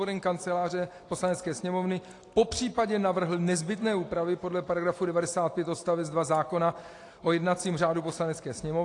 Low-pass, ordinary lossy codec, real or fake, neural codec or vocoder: 10.8 kHz; AAC, 48 kbps; real; none